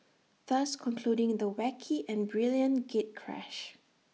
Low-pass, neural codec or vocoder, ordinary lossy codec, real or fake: none; none; none; real